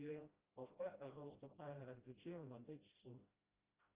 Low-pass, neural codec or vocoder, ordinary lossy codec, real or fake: 3.6 kHz; codec, 16 kHz, 0.5 kbps, FreqCodec, smaller model; Opus, 24 kbps; fake